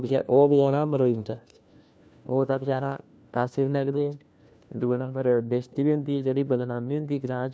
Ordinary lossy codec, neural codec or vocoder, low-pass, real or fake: none; codec, 16 kHz, 1 kbps, FunCodec, trained on LibriTTS, 50 frames a second; none; fake